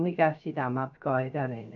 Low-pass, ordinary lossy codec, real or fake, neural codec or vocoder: 7.2 kHz; none; fake; codec, 16 kHz, 0.3 kbps, FocalCodec